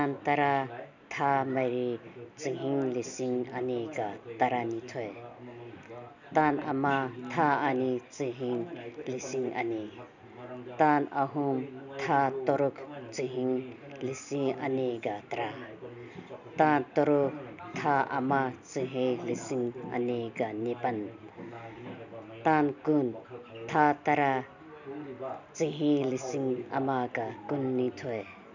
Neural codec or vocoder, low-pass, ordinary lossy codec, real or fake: none; 7.2 kHz; none; real